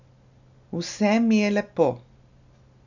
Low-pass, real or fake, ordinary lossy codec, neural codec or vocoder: 7.2 kHz; real; none; none